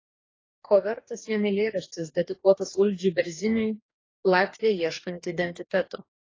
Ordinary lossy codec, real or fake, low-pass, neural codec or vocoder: AAC, 32 kbps; fake; 7.2 kHz; codec, 44.1 kHz, 2.6 kbps, DAC